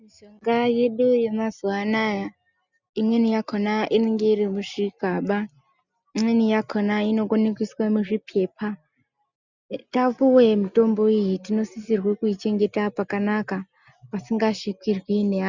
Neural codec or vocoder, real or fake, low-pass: none; real; 7.2 kHz